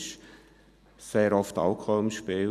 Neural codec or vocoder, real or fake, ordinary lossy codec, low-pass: none; real; none; 14.4 kHz